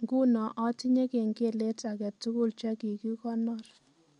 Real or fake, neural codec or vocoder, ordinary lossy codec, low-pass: real; none; MP3, 64 kbps; 10.8 kHz